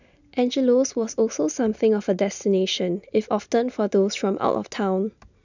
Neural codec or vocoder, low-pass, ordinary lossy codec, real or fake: none; 7.2 kHz; none; real